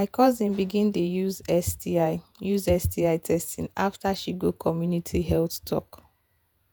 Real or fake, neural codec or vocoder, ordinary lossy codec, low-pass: fake; vocoder, 48 kHz, 128 mel bands, Vocos; none; none